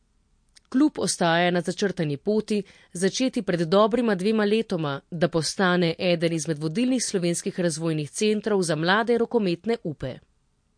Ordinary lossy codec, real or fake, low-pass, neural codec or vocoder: MP3, 48 kbps; real; 9.9 kHz; none